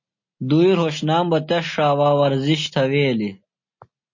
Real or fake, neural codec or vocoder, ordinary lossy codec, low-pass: real; none; MP3, 32 kbps; 7.2 kHz